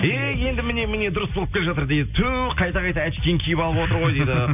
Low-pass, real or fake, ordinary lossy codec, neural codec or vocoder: 3.6 kHz; real; none; none